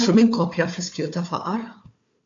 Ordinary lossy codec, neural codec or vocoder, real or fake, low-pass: AAC, 48 kbps; codec, 16 kHz, 4 kbps, FunCodec, trained on Chinese and English, 50 frames a second; fake; 7.2 kHz